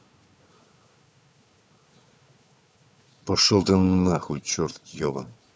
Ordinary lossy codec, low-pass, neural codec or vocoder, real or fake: none; none; codec, 16 kHz, 4 kbps, FunCodec, trained on Chinese and English, 50 frames a second; fake